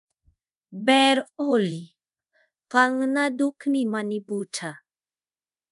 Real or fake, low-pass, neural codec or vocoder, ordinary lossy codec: fake; 10.8 kHz; codec, 24 kHz, 0.5 kbps, DualCodec; none